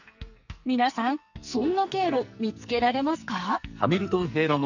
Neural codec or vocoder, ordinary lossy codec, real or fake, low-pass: codec, 44.1 kHz, 2.6 kbps, SNAC; none; fake; 7.2 kHz